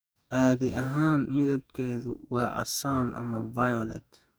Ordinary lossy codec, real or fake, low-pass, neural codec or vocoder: none; fake; none; codec, 44.1 kHz, 2.6 kbps, DAC